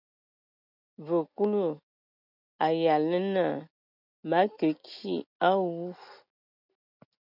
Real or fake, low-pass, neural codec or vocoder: real; 5.4 kHz; none